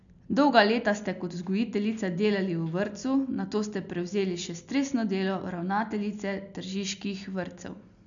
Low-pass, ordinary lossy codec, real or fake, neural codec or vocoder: 7.2 kHz; none; real; none